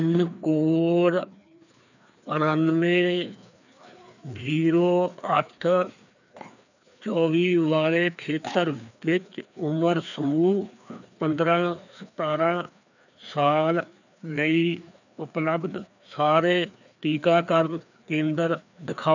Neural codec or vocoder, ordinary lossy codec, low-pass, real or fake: codec, 16 kHz, 2 kbps, FreqCodec, larger model; none; 7.2 kHz; fake